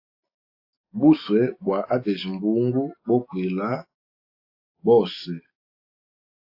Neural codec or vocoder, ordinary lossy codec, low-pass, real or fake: none; AAC, 32 kbps; 5.4 kHz; real